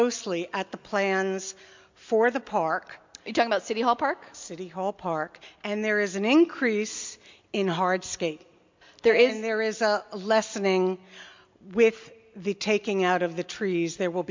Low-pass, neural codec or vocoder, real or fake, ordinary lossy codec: 7.2 kHz; none; real; MP3, 64 kbps